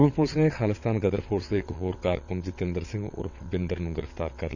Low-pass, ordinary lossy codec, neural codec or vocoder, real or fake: 7.2 kHz; none; vocoder, 22.05 kHz, 80 mel bands, WaveNeXt; fake